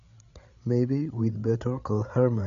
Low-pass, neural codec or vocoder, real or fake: 7.2 kHz; codec, 16 kHz, 8 kbps, FreqCodec, larger model; fake